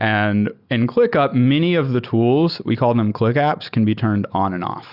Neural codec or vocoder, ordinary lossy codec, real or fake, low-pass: none; Opus, 64 kbps; real; 5.4 kHz